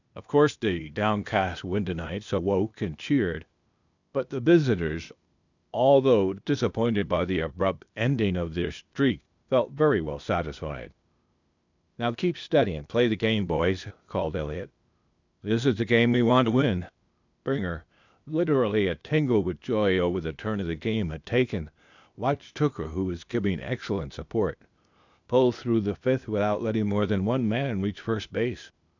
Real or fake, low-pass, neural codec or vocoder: fake; 7.2 kHz; codec, 16 kHz, 0.8 kbps, ZipCodec